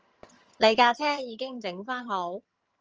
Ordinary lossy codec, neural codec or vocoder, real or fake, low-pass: Opus, 24 kbps; vocoder, 22.05 kHz, 80 mel bands, HiFi-GAN; fake; 7.2 kHz